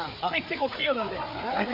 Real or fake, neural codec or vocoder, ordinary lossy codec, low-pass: fake; codec, 16 kHz, 4 kbps, FreqCodec, larger model; MP3, 48 kbps; 5.4 kHz